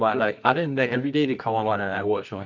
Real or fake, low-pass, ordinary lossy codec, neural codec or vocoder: fake; 7.2 kHz; none; codec, 24 kHz, 0.9 kbps, WavTokenizer, medium music audio release